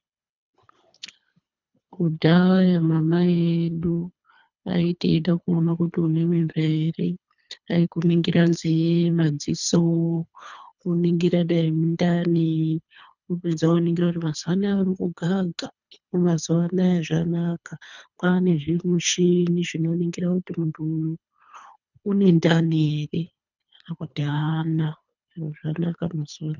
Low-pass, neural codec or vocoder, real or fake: 7.2 kHz; codec, 24 kHz, 3 kbps, HILCodec; fake